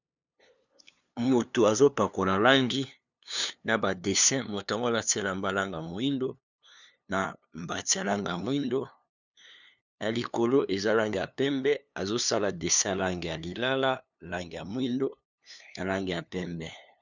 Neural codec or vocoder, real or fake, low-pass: codec, 16 kHz, 2 kbps, FunCodec, trained on LibriTTS, 25 frames a second; fake; 7.2 kHz